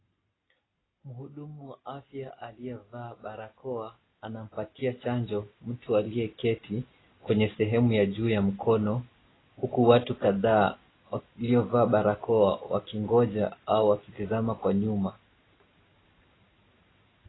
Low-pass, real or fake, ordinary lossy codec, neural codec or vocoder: 7.2 kHz; real; AAC, 16 kbps; none